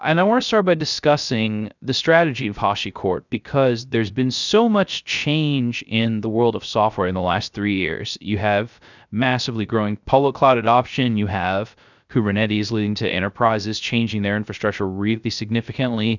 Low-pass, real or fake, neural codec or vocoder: 7.2 kHz; fake; codec, 16 kHz, 0.3 kbps, FocalCodec